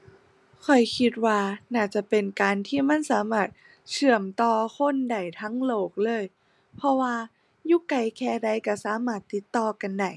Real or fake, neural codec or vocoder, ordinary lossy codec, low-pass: real; none; none; none